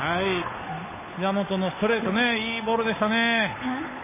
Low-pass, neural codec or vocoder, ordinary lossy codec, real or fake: 3.6 kHz; codec, 16 kHz, 8 kbps, FunCodec, trained on Chinese and English, 25 frames a second; MP3, 16 kbps; fake